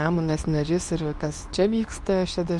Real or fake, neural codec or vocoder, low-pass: fake; codec, 24 kHz, 0.9 kbps, WavTokenizer, medium speech release version 2; 10.8 kHz